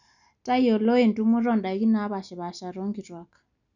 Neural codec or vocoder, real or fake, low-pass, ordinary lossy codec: none; real; 7.2 kHz; none